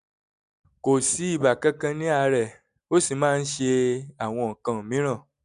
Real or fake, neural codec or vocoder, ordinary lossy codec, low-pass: real; none; none; 10.8 kHz